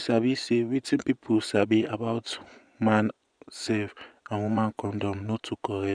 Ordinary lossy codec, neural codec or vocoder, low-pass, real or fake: none; vocoder, 24 kHz, 100 mel bands, Vocos; 9.9 kHz; fake